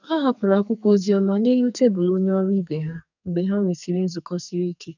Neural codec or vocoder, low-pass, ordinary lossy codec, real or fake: codec, 44.1 kHz, 2.6 kbps, SNAC; 7.2 kHz; none; fake